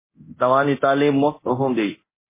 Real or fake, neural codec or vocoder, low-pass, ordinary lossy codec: fake; codec, 24 kHz, 0.9 kbps, DualCodec; 3.6 kHz; MP3, 16 kbps